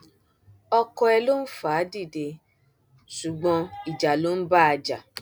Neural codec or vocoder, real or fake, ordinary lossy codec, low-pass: none; real; none; none